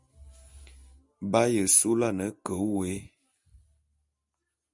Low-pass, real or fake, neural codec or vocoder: 10.8 kHz; real; none